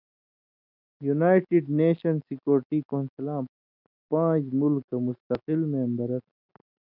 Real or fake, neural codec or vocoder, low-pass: real; none; 5.4 kHz